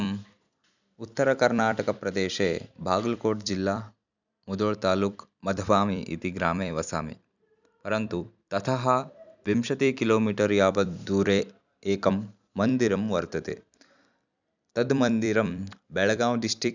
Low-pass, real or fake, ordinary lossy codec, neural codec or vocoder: 7.2 kHz; fake; none; vocoder, 44.1 kHz, 128 mel bands every 256 samples, BigVGAN v2